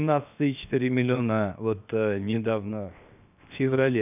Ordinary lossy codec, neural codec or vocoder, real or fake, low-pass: none; codec, 16 kHz, 0.7 kbps, FocalCodec; fake; 3.6 kHz